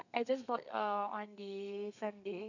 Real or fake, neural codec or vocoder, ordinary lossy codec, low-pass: fake; codec, 32 kHz, 1.9 kbps, SNAC; none; 7.2 kHz